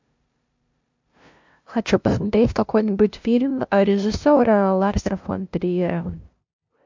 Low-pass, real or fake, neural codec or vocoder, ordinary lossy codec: 7.2 kHz; fake; codec, 16 kHz, 0.5 kbps, FunCodec, trained on LibriTTS, 25 frames a second; MP3, 64 kbps